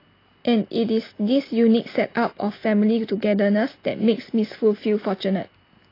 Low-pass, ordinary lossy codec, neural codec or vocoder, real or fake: 5.4 kHz; AAC, 24 kbps; none; real